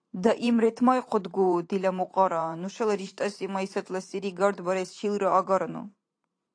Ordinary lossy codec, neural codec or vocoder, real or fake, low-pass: AAC, 48 kbps; vocoder, 44.1 kHz, 128 mel bands every 512 samples, BigVGAN v2; fake; 9.9 kHz